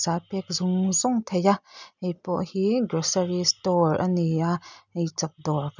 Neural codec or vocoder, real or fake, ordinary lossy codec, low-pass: none; real; none; 7.2 kHz